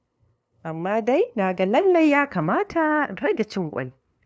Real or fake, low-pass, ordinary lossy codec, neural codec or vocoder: fake; none; none; codec, 16 kHz, 2 kbps, FunCodec, trained on LibriTTS, 25 frames a second